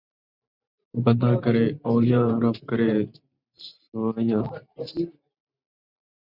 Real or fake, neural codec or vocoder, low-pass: fake; vocoder, 44.1 kHz, 128 mel bands every 512 samples, BigVGAN v2; 5.4 kHz